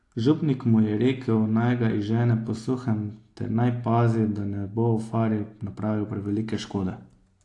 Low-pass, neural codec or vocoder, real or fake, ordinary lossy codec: 10.8 kHz; none; real; AAC, 48 kbps